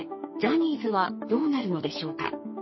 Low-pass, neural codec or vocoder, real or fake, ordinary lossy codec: 7.2 kHz; codec, 44.1 kHz, 2.6 kbps, SNAC; fake; MP3, 24 kbps